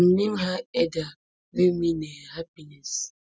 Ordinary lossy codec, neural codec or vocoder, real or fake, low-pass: none; none; real; none